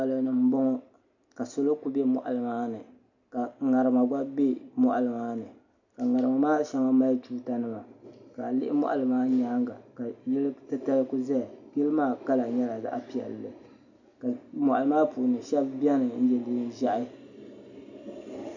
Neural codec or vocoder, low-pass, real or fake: none; 7.2 kHz; real